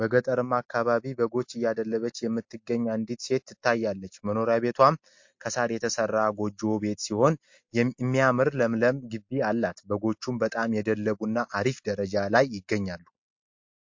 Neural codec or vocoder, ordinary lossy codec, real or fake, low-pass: none; MP3, 48 kbps; real; 7.2 kHz